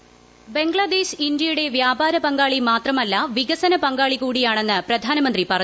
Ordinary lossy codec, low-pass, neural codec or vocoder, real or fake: none; none; none; real